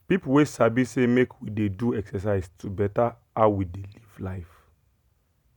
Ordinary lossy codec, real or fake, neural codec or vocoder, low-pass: none; real; none; 19.8 kHz